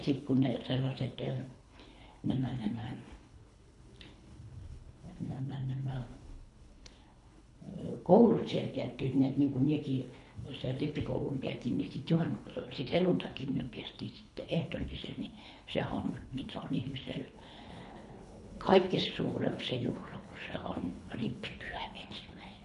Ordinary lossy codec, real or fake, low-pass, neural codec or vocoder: none; fake; 10.8 kHz; codec, 24 kHz, 3 kbps, HILCodec